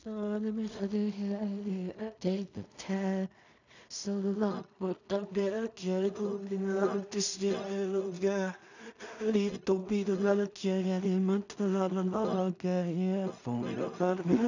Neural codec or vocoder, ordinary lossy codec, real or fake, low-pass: codec, 16 kHz in and 24 kHz out, 0.4 kbps, LongCat-Audio-Codec, two codebook decoder; none; fake; 7.2 kHz